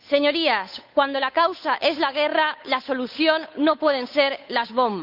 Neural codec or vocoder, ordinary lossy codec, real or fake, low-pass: codec, 16 kHz, 8 kbps, FunCodec, trained on Chinese and English, 25 frames a second; none; fake; 5.4 kHz